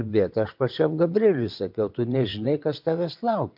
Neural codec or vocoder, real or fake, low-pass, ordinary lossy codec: vocoder, 22.05 kHz, 80 mel bands, WaveNeXt; fake; 5.4 kHz; MP3, 48 kbps